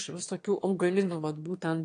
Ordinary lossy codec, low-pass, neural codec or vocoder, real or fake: AAC, 48 kbps; 9.9 kHz; autoencoder, 22.05 kHz, a latent of 192 numbers a frame, VITS, trained on one speaker; fake